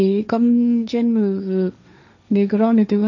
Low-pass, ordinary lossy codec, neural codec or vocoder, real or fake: 7.2 kHz; none; codec, 16 kHz, 1.1 kbps, Voila-Tokenizer; fake